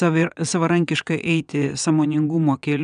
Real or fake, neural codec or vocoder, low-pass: fake; vocoder, 22.05 kHz, 80 mel bands, WaveNeXt; 9.9 kHz